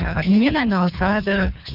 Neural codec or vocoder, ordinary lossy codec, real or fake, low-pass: codec, 24 kHz, 3 kbps, HILCodec; none; fake; 5.4 kHz